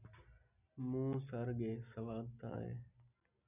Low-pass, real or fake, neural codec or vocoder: 3.6 kHz; real; none